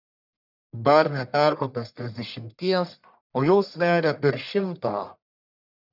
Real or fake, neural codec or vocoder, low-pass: fake; codec, 44.1 kHz, 1.7 kbps, Pupu-Codec; 5.4 kHz